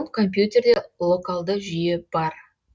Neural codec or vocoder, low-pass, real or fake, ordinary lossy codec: none; none; real; none